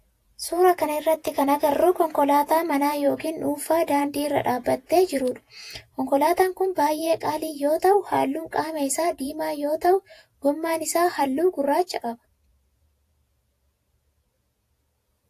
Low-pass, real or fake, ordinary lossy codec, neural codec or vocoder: 14.4 kHz; fake; AAC, 64 kbps; vocoder, 48 kHz, 128 mel bands, Vocos